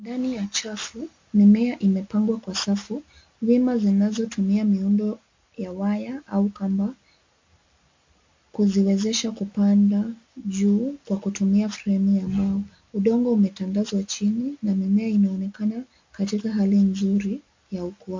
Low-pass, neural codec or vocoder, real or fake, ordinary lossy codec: 7.2 kHz; none; real; MP3, 48 kbps